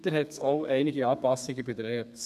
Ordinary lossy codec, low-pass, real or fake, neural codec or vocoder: none; 14.4 kHz; fake; codec, 32 kHz, 1.9 kbps, SNAC